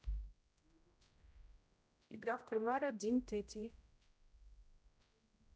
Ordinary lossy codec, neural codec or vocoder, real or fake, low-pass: none; codec, 16 kHz, 0.5 kbps, X-Codec, HuBERT features, trained on general audio; fake; none